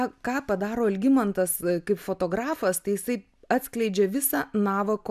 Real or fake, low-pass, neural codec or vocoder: real; 14.4 kHz; none